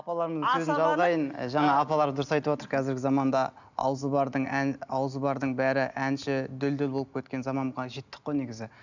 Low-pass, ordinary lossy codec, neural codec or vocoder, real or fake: 7.2 kHz; none; none; real